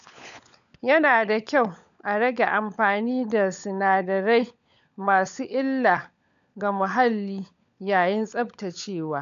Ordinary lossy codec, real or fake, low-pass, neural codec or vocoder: none; fake; 7.2 kHz; codec, 16 kHz, 16 kbps, FunCodec, trained on LibriTTS, 50 frames a second